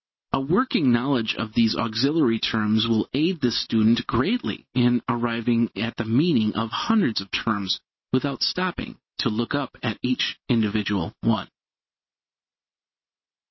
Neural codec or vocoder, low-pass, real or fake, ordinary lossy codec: none; 7.2 kHz; real; MP3, 24 kbps